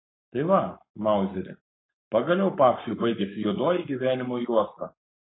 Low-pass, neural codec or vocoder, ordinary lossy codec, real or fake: 7.2 kHz; codec, 44.1 kHz, 7.8 kbps, Pupu-Codec; AAC, 16 kbps; fake